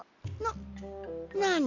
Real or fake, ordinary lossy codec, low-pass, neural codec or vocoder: real; none; 7.2 kHz; none